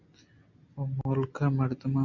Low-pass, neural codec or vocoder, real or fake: 7.2 kHz; none; real